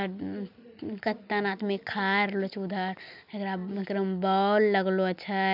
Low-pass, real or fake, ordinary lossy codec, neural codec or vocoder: 5.4 kHz; real; none; none